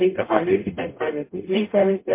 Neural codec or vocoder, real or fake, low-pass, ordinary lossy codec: codec, 44.1 kHz, 0.9 kbps, DAC; fake; 3.6 kHz; MP3, 24 kbps